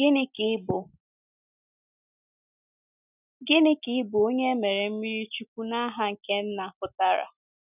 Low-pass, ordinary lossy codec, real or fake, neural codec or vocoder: 3.6 kHz; none; real; none